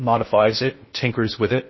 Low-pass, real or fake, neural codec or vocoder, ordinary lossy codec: 7.2 kHz; fake; codec, 16 kHz in and 24 kHz out, 0.6 kbps, FocalCodec, streaming, 4096 codes; MP3, 24 kbps